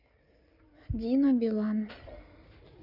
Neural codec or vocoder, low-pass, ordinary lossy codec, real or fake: codec, 16 kHz in and 24 kHz out, 2.2 kbps, FireRedTTS-2 codec; 5.4 kHz; none; fake